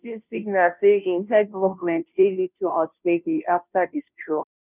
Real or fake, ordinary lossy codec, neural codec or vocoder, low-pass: fake; none; codec, 16 kHz, 0.5 kbps, FunCodec, trained on Chinese and English, 25 frames a second; 3.6 kHz